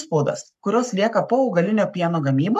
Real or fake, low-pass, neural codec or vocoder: fake; 14.4 kHz; codec, 44.1 kHz, 7.8 kbps, Pupu-Codec